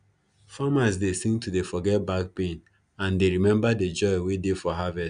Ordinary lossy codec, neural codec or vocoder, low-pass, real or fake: none; none; 9.9 kHz; real